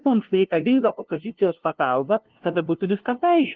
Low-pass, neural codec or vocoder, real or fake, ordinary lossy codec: 7.2 kHz; codec, 16 kHz, 0.5 kbps, FunCodec, trained on LibriTTS, 25 frames a second; fake; Opus, 16 kbps